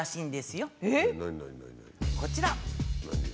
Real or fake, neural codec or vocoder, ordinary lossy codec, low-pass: real; none; none; none